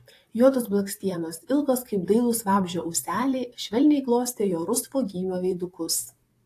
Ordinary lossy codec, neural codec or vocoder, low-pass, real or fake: AAC, 64 kbps; vocoder, 44.1 kHz, 128 mel bands, Pupu-Vocoder; 14.4 kHz; fake